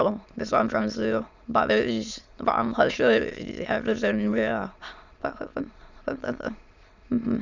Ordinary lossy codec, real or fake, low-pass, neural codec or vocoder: none; fake; 7.2 kHz; autoencoder, 22.05 kHz, a latent of 192 numbers a frame, VITS, trained on many speakers